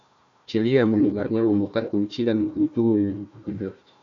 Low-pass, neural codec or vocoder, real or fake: 7.2 kHz; codec, 16 kHz, 1 kbps, FunCodec, trained on Chinese and English, 50 frames a second; fake